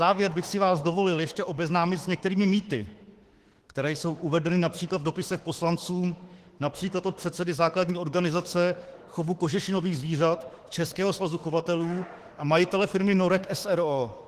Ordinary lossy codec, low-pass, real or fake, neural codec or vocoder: Opus, 16 kbps; 14.4 kHz; fake; autoencoder, 48 kHz, 32 numbers a frame, DAC-VAE, trained on Japanese speech